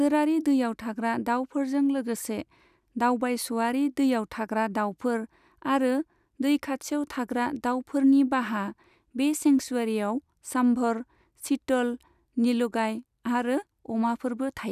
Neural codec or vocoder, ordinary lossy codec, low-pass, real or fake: none; none; 14.4 kHz; real